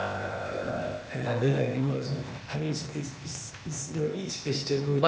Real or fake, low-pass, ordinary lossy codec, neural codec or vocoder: fake; none; none; codec, 16 kHz, 0.8 kbps, ZipCodec